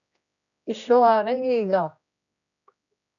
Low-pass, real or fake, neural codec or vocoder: 7.2 kHz; fake; codec, 16 kHz, 1 kbps, X-Codec, HuBERT features, trained on general audio